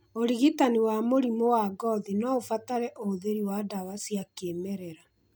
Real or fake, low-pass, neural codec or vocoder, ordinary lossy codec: real; none; none; none